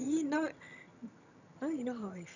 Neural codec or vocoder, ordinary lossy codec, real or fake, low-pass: vocoder, 22.05 kHz, 80 mel bands, HiFi-GAN; none; fake; 7.2 kHz